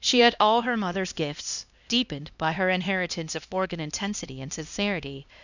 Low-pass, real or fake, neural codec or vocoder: 7.2 kHz; fake; codec, 16 kHz, 1 kbps, X-Codec, HuBERT features, trained on LibriSpeech